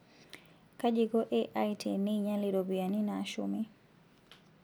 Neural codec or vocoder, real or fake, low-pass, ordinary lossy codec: none; real; none; none